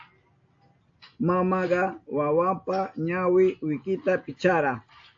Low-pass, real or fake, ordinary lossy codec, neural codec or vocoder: 7.2 kHz; real; AAC, 48 kbps; none